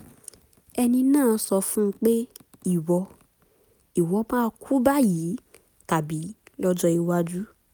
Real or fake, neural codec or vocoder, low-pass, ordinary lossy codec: real; none; none; none